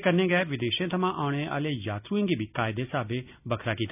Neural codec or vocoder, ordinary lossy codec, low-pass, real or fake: none; none; 3.6 kHz; real